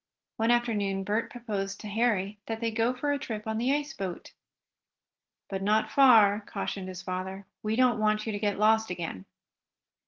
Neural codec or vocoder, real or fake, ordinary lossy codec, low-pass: none; real; Opus, 16 kbps; 7.2 kHz